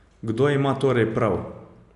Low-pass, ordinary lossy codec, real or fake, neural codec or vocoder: 10.8 kHz; none; real; none